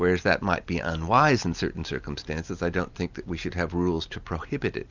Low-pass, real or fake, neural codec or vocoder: 7.2 kHz; real; none